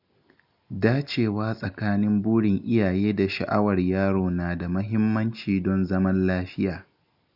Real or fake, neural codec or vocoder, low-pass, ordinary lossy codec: real; none; 5.4 kHz; none